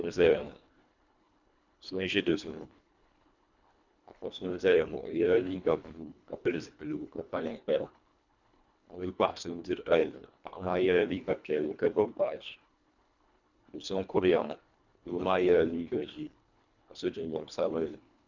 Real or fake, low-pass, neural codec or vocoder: fake; 7.2 kHz; codec, 24 kHz, 1.5 kbps, HILCodec